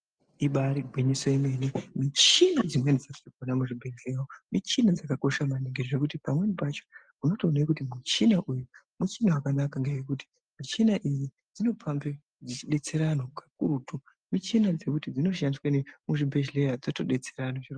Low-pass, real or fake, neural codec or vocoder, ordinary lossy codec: 9.9 kHz; real; none; Opus, 32 kbps